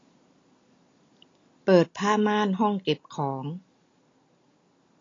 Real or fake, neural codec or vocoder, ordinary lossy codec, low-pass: real; none; AAC, 32 kbps; 7.2 kHz